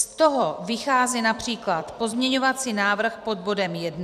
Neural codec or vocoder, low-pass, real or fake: vocoder, 44.1 kHz, 128 mel bands every 256 samples, BigVGAN v2; 14.4 kHz; fake